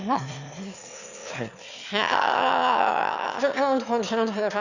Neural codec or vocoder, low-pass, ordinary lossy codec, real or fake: autoencoder, 22.05 kHz, a latent of 192 numbers a frame, VITS, trained on one speaker; 7.2 kHz; Opus, 64 kbps; fake